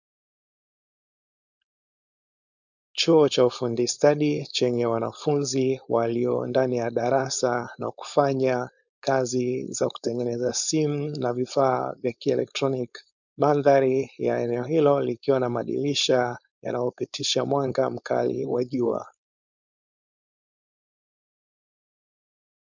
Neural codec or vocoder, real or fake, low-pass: codec, 16 kHz, 4.8 kbps, FACodec; fake; 7.2 kHz